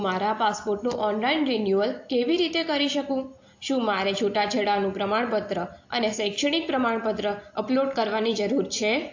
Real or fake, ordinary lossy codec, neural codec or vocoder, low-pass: real; AAC, 48 kbps; none; 7.2 kHz